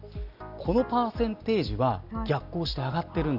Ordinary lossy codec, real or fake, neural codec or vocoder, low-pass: none; real; none; 5.4 kHz